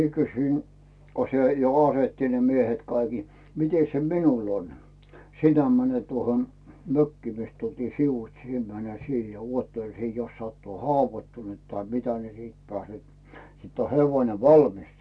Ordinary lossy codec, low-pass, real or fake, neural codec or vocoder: none; none; real; none